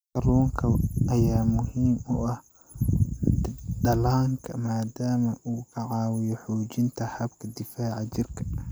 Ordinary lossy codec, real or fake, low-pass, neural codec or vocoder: none; real; none; none